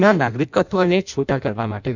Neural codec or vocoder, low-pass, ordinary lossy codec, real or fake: codec, 16 kHz in and 24 kHz out, 0.6 kbps, FireRedTTS-2 codec; 7.2 kHz; none; fake